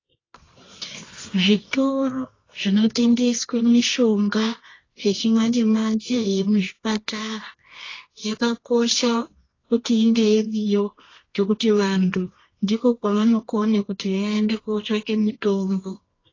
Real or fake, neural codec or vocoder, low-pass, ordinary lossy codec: fake; codec, 24 kHz, 0.9 kbps, WavTokenizer, medium music audio release; 7.2 kHz; AAC, 32 kbps